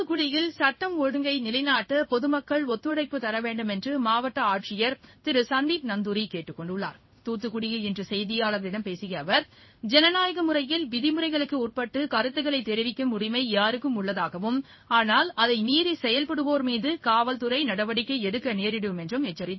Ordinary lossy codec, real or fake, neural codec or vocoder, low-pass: MP3, 24 kbps; fake; codec, 16 kHz in and 24 kHz out, 1 kbps, XY-Tokenizer; 7.2 kHz